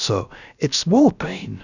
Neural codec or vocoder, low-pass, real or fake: codec, 16 kHz, about 1 kbps, DyCAST, with the encoder's durations; 7.2 kHz; fake